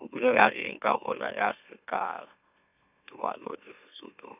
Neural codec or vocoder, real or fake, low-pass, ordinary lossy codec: autoencoder, 44.1 kHz, a latent of 192 numbers a frame, MeloTTS; fake; 3.6 kHz; none